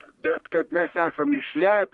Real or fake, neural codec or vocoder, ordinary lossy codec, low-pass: fake; codec, 44.1 kHz, 1.7 kbps, Pupu-Codec; MP3, 96 kbps; 10.8 kHz